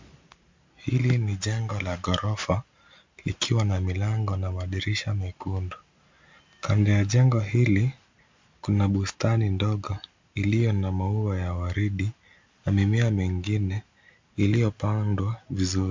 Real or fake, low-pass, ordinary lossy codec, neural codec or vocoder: real; 7.2 kHz; MP3, 64 kbps; none